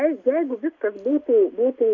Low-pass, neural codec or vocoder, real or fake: 7.2 kHz; none; real